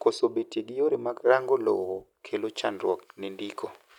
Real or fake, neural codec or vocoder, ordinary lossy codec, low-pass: real; none; none; 19.8 kHz